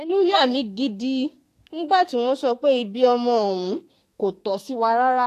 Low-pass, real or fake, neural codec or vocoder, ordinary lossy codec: 14.4 kHz; fake; codec, 32 kHz, 1.9 kbps, SNAC; AAC, 64 kbps